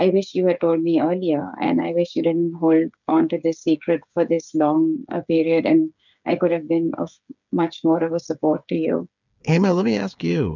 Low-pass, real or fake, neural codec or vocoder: 7.2 kHz; fake; codec, 16 kHz, 8 kbps, FreqCodec, smaller model